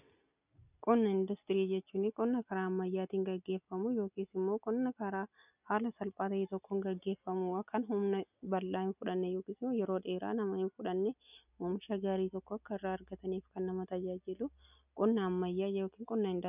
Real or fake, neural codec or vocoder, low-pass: real; none; 3.6 kHz